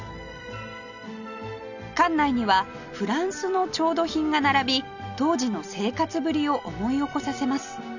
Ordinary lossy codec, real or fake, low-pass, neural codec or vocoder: none; real; 7.2 kHz; none